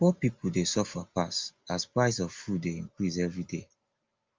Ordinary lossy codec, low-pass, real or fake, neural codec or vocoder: Opus, 32 kbps; 7.2 kHz; real; none